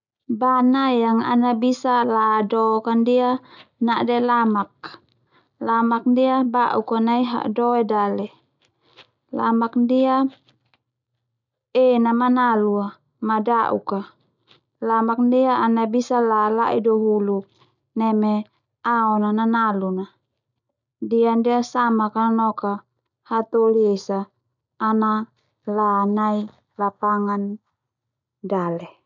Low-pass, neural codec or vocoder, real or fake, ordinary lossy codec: 7.2 kHz; none; real; none